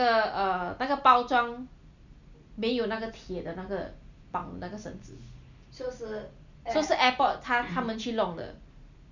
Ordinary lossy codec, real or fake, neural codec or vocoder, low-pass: none; real; none; 7.2 kHz